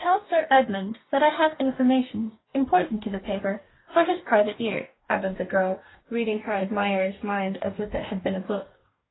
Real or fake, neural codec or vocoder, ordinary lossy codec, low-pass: fake; codec, 44.1 kHz, 2.6 kbps, DAC; AAC, 16 kbps; 7.2 kHz